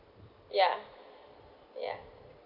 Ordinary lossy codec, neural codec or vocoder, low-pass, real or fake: none; none; 5.4 kHz; real